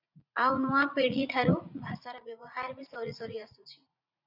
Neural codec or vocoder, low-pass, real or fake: vocoder, 44.1 kHz, 80 mel bands, Vocos; 5.4 kHz; fake